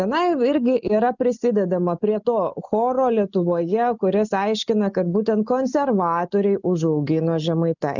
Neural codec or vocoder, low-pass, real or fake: none; 7.2 kHz; real